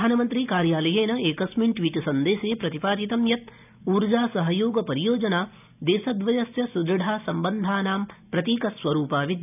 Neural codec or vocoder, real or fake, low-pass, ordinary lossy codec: none; real; 3.6 kHz; none